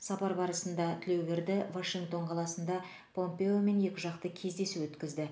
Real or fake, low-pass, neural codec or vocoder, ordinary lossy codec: real; none; none; none